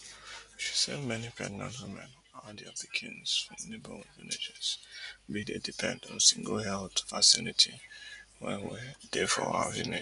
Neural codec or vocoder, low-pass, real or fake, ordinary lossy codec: none; 10.8 kHz; real; none